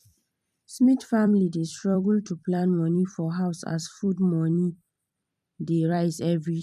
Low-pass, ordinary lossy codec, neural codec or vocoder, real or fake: 14.4 kHz; none; vocoder, 44.1 kHz, 128 mel bands every 256 samples, BigVGAN v2; fake